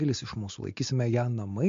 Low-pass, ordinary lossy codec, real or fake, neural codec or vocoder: 7.2 kHz; MP3, 48 kbps; real; none